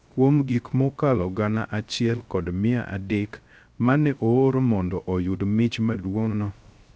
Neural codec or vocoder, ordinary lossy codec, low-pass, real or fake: codec, 16 kHz, 0.3 kbps, FocalCodec; none; none; fake